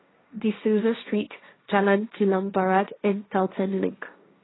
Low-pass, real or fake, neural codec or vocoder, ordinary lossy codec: 7.2 kHz; fake; codec, 16 kHz, 1.1 kbps, Voila-Tokenizer; AAC, 16 kbps